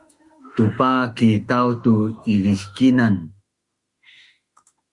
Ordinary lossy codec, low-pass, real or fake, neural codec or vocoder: Opus, 64 kbps; 10.8 kHz; fake; autoencoder, 48 kHz, 32 numbers a frame, DAC-VAE, trained on Japanese speech